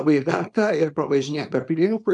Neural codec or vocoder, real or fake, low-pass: codec, 24 kHz, 0.9 kbps, WavTokenizer, small release; fake; 10.8 kHz